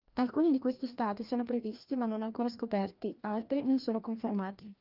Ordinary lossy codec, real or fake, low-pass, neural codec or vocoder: Opus, 32 kbps; fake; 5.4 kHz; codec, 16 kHz, 1 kbps, FreqCodec, larger model